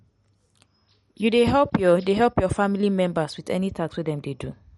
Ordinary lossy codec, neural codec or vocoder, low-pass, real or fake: MP3, 48 kbps; none; 19.8 kHz; real